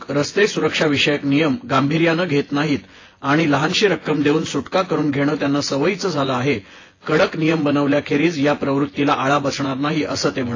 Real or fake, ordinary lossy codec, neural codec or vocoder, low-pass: fake; AAC, 32 kbps; vocoder, 24 kHz, 100 mel bands, Vocos; 7.2 kHz